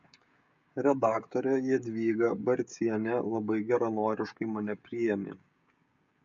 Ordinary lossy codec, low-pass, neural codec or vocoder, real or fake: MP3, 64 kbps; 7.2 kHz; codec, 16 kHz, 16 kbps, FreqCodec, smaller model; fake